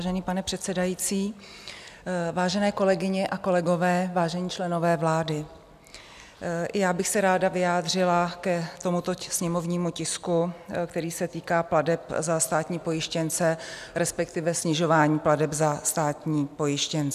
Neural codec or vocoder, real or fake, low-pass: none; real; 14.4 kHz